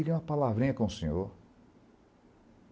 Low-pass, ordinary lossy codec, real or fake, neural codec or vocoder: none; none; real; none